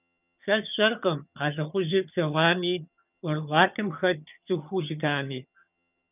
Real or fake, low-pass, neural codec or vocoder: fake; 3.6 kHz; vocoder, 22.05 kHz, 80 mel bands, HiFi-GAN